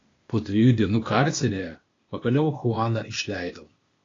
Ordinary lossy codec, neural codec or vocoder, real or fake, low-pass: AAC, 32 kbps; codec, 16 kHz, 0.8 kbps, ZipCodec; fake; 7.2 kHz